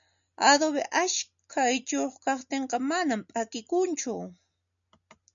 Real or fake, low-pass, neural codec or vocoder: real; 7.2 kHz; none